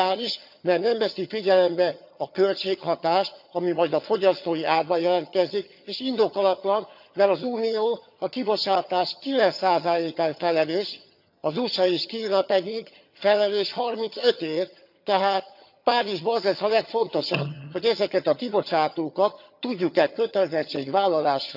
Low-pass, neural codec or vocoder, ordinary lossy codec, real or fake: 5.4 kHz; vocoder, 22.05 kHz, 80 mel bands, HiFi-GAN; none; fake